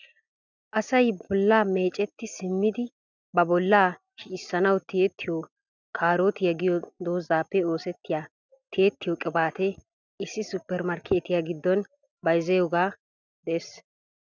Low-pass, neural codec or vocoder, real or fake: 7.2 kHz; none; real